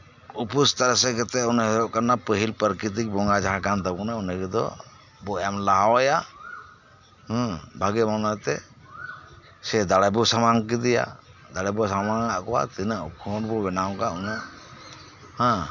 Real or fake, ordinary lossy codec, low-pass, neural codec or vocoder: real; none; 7.2 kHz; none